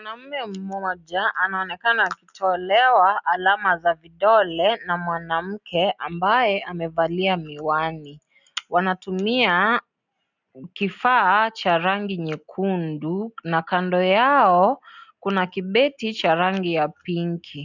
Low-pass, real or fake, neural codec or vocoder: 7.2 kHz; real; none